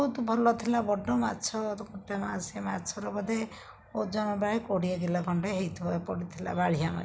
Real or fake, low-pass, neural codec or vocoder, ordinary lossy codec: real; none; none; none